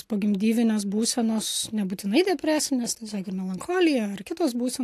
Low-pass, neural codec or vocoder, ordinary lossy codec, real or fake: 14.4 kHz; none; AAC, 64 kbps; real